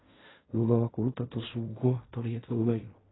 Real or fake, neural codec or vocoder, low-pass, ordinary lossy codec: fake; codec, 16 kHz in and 24 kHz out, 0.4 kbps, LongCat-Audio-Codec, fine tuned four codebook decoder; 7.2 kHz; AAC, 16 kbps